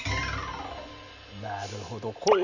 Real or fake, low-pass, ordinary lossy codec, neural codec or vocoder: real; 7.2 kHz; none; none